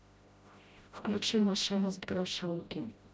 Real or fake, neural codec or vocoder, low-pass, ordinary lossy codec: fake; codec, 16 kHz, 0.5 kbps, FreqCodec, smaller model; none; none